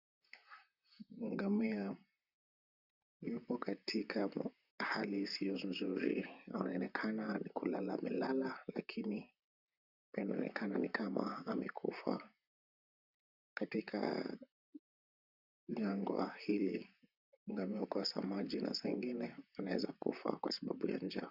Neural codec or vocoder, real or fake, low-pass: vocoder, 44.1 kHz, 128 mel bands, Pupu-Vocoder; fake; 5.4 kHz